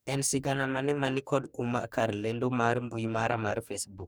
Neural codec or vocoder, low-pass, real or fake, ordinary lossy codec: codec, 44.1 kHz, 2.6 kbps, DAC; none; fake; none